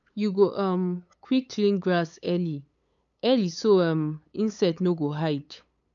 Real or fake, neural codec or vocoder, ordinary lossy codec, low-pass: fake; codec, 16 kHz, 8 kbps, FunCodec, trained on LibriTTS, 25 frames a second; none; 7.2 kHz